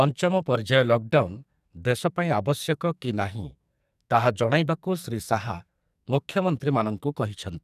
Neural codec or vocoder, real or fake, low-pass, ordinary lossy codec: codec, 44.1 kHz, 2.6 kbps, SNAC; fake; 14.4 kHz; none